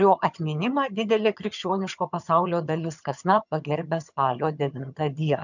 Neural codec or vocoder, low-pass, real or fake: vocoder, 22.05 kHz, 80 mel bands, HiFi-GAN; 7.2 kHz; fake